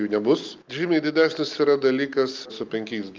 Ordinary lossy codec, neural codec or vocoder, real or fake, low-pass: Opus, 32 kbps; none; real; 7.2 kHz